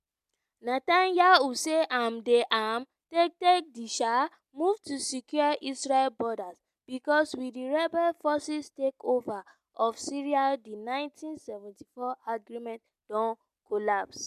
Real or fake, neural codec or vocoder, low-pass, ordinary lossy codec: real; none; 14.4 kHz; MP3, 96 kbps